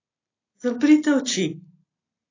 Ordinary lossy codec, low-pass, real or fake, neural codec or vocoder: AAC, 32 kbps; 7.2 kHz; real; none